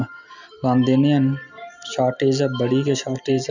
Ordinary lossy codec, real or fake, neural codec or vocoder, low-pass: none; real; none; 7.2 kHz